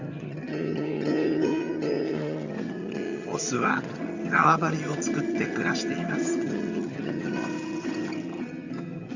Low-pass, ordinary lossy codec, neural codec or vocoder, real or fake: 7.2 kHz; Opus, 64 kbps; vocoder, 22.05 kHz, 80 mel bands, HiFi-GAN; fake